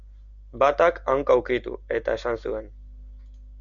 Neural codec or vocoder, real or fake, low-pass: none; real; 7.2 kHz